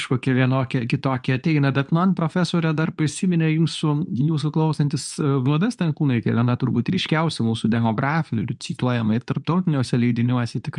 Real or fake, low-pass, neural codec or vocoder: fake; 10.8 kHz; codec, 24 kHz, 0.9 kbps, WavTokenizer, medium speech release version 2